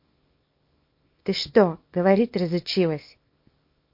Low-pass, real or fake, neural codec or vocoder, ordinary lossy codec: 5.4 kHz; fake; codec, 24 kHz, 0.9 kbps, WavTokenizer, small release; MP3, 32 kbps